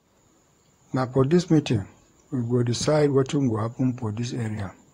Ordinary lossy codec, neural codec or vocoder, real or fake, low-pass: AAC, 48 kbps; vocoder, 44.1 kHz, 128 mel bands, Pupu-Vocoder; fake; 19.8 kHz